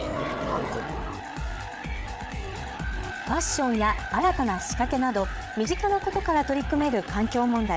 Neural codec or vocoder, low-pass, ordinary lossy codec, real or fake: codec, 16 kHz, 8 kbps, FreqCodec, larger model; none; none; fake